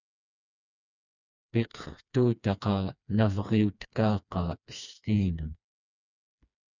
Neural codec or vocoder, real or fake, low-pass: codec, 16 kHz, 2 kbps, FreqCodec, smaller model; fake; 7.2 kHz